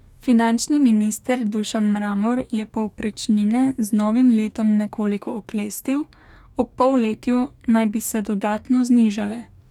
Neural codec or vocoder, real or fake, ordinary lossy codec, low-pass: codec, 44.1 kHz, 2.6 kbps, DAC; fake; none; 19.8 kHz